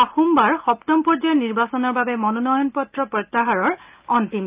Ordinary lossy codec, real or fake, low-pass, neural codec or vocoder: Opus, 24 kbps; real; 3.6 kHz; none